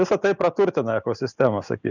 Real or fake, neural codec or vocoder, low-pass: real; none; 7.2 kHz